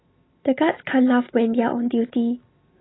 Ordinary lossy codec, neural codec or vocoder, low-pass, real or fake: AAC, 16 kbps; none; 7.2 kHz; real